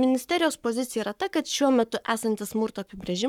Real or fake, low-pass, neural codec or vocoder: fake; 19.8 kHz; codec, 44.1 kHz, 7.8 kbps, Pupu-Codec